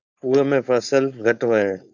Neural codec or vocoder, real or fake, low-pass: codec, 16 kHz, 4.8 kbps, FACodec; fake; 7.2 kHz